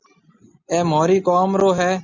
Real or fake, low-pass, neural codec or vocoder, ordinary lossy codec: real; 7.2 kHz; none; Opus, 64 kbps